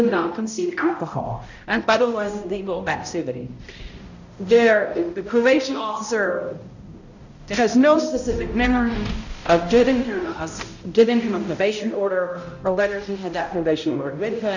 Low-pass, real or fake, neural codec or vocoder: 7.2 kHz; fake; codec, 16 kHz, 0.5 kbps, X-Codec, HuBERT features, trained on balanced general audio